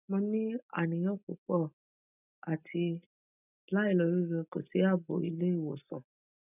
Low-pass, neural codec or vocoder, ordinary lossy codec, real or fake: 3.6 kHz; none; none; real